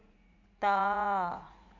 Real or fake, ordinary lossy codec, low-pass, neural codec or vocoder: fake; none; 7.2 kHz; vocoder, 44.1 kHz, 80 mel bands, Vocos